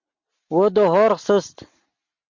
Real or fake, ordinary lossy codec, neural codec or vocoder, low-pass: real; MP3, 64 kbps; none; 7.2 kHz